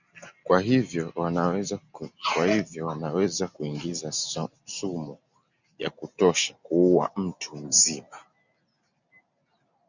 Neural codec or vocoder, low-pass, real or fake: none; 7.2 kHz; real